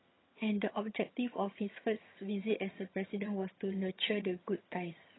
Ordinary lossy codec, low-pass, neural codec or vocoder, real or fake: AAC, 16 kbps; 7.2 kHz; vocoder, 22.05 kHz, 80 mel bands, HiFi-GAN; fake